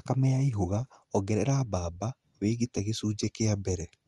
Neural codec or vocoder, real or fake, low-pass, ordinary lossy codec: none; real; 10.8 kHz; Opus, 32 kbps